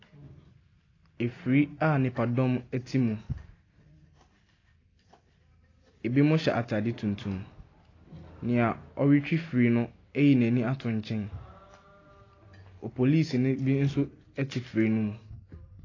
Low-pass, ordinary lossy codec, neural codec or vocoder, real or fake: 7.2 kHz; AAC, 32 kbps; none; real